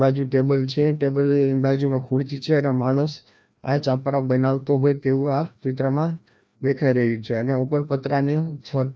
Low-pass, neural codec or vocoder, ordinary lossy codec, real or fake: none; codec, 16 kHz, 1 kbps, FreqCodec, larger model; none; fake